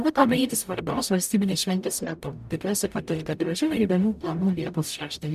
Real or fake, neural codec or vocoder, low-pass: fake; codec, 44.1 kHz, 0.9 kbps, DAC; 14.4 kHz